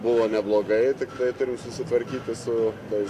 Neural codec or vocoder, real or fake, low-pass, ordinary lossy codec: none; real; 14.4 kHz; AAC, 64 kbps